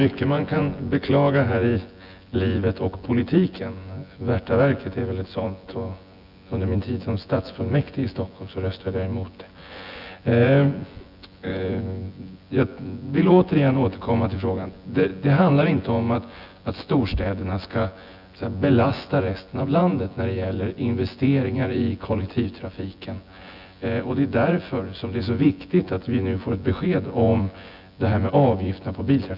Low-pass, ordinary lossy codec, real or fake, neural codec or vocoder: 5.4 kHz; none; fake; vocoder, 24 kHz, 100 mel bands, Vocos